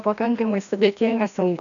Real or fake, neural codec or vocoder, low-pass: fake; codec, 16 kHz, 1 kbps, FreqCodec, larger model; 7.2 kHz